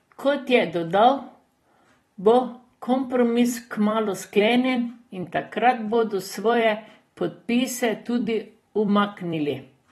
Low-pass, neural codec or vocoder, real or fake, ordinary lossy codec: 19.8 kHz; vocoder, 44.1 kHz, 128 mel bands every 256 samples, BigVGAN v2; fake; AAC, 32 kbps